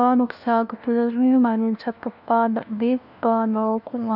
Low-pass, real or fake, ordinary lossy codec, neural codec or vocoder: 5.4 kHz; fake; none; codec, 16 kHz, 1 kbps, FunCodec, trained on LibriTTS, 50 frames a second